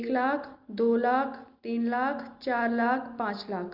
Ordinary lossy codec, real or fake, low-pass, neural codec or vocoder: Opus, 24 kbps; real; 5.4 kHz; none